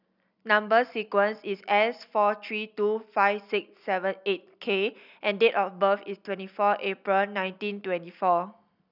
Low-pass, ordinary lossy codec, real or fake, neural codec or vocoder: 5.4 kHz; none; real; none